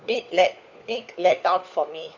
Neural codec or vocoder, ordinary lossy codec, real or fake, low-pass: codec, 16 kHz, 4 kbps, FunCodec, trained on LibriTTS, 50 frames a second; none; fake; 7.2 kHz